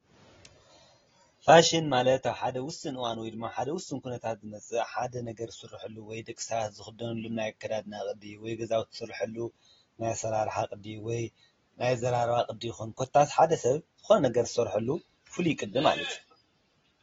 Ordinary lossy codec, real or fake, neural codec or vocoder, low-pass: AAC, 24 kbps; real; none; 7.2 kHz